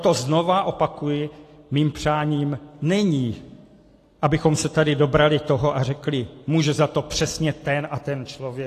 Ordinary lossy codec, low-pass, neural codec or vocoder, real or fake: AAC, 48 kbps; 14.4 kHz; none; real